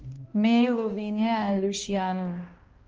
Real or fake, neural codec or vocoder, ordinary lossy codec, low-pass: fake; codec, 16 kHz, 1 kbps, X-Codec, HuBERT features, trained on balanced general audio; Opus, 24 kbps; 7.2 kHz